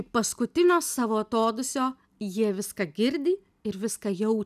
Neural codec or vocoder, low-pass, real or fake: none; 14.4 kHz; real